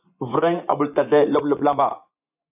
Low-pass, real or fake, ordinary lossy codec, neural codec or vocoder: 3.6 kHz; fake; AAC, 32 kbps; vocoder, 24 kHz, 100 mel bands, Vocos